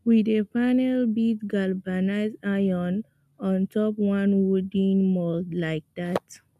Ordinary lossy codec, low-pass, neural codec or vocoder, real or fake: none; 14.4 kHz; none; real